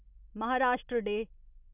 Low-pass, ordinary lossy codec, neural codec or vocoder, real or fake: 3.6 kHz; none; none; real